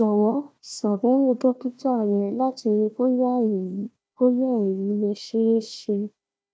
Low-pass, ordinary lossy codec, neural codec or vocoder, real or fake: none; none; codec, 16 kHz, 1 kbps, FunCodec, trained on Chinese and English, 50 frames a second; fake